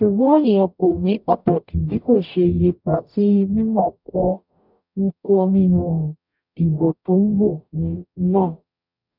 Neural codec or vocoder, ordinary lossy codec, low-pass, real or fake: codec, 44.1 kHz, 0.9 kbps, DAC; none; 5.4 kHz; fake